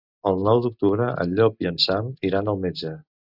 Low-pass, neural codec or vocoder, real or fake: 5.4 kHz; none; real